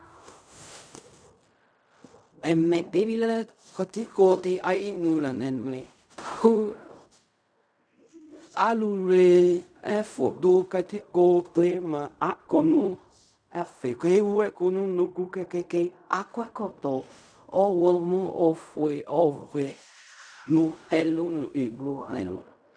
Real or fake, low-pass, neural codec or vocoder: fake; 9.9 kHz; codec, 16 kHz in and 24 kHz out, 0.4 kbps, LongCat-Audio-Codec, fine tuned four codebook decoder